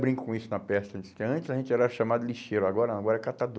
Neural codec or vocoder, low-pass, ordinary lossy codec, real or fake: none; none; none; real